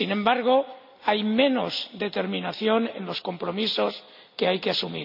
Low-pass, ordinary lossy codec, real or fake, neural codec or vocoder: 5.4 kHz; none; real; none